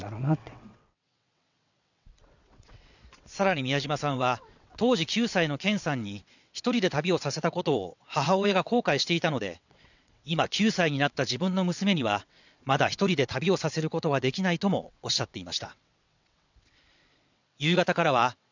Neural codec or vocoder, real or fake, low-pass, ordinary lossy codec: vocoder, 22.05 kHz, 80 mel bands, WaveNeXt; fake; 7.2 kHz; none